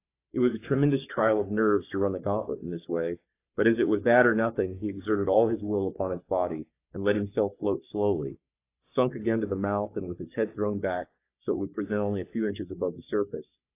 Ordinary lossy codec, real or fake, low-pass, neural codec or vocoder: AAC, 24 kbps; fake; 3.6 kHz; codec, 44.1 kHz, 3.4 kbps, Pupu-Codec